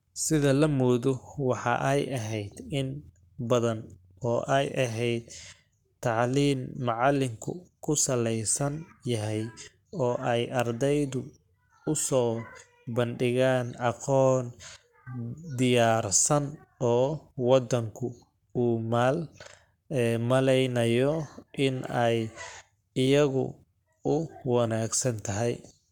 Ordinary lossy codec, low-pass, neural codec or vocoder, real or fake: none; 19.8 kHz; codec, 44.1 kHz, 7.8 kbps, Pupu-Codec; fake